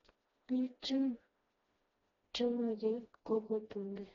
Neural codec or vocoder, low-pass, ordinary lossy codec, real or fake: codec, 16 kHz, 1 kbps, FreqCodec, smaller model; 7.2 kHz; AAC, 24 kbps; fake